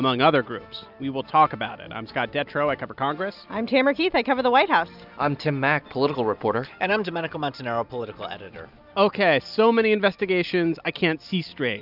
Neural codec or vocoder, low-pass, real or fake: none; 5.4 kHz; real